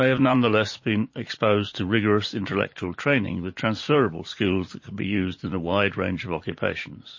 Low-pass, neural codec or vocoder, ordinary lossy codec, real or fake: 7.2 kHz; vocoder, 44.1 kHz, 80 mel bands, Vocos; MP3, 32 kbps; fake